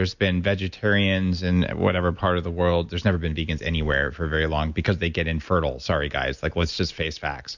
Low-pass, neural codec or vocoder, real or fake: 7.2 kHz; none; real